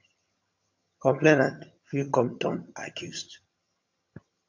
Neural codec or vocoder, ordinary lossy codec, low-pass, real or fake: vocoder, 22.05 kHz, 80 mel bands, HiFi-GAN; AAC, 48 kbps; 7.2 kHz; fake